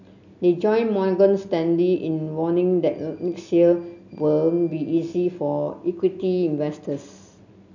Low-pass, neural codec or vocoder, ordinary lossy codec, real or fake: 7.2 kHz; none; none; real